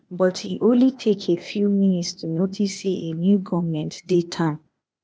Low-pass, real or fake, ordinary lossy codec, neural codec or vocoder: none; fake; none; codec, 16 kHz, 0.8 kbps, ZipCodec